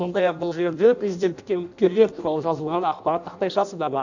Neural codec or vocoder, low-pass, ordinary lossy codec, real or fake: codec, 16 kHz in and 24 kHz out, 0.6 kbps, FireRedTTS-2 codec; 7.2 kHz; none; fake